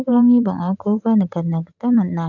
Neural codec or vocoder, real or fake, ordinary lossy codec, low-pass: vocoder, 44.1 kHz, 128 mel bands every 512 samples, BigVGAN v2; fake; none; 7.2 kHz